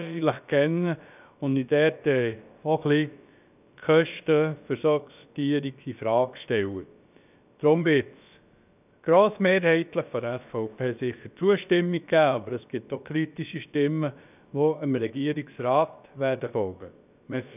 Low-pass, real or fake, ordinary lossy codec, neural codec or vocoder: 3.6 kHz; fake; none; codec, 16 kHz, about 1 kbps, DyCAST, with the encoder's durations